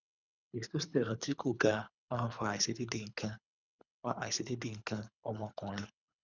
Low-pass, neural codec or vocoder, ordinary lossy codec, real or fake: 7.2 kHz; codec, 24 kHz, 3 kbps, HILCodec; none; fake